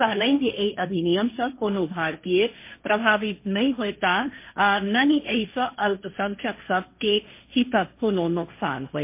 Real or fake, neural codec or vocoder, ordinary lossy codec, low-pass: fake; codec, 16 kHz, 1.1 kbps, Voila-Tokenizer; MP3, 24 kbps; 3.6 kHz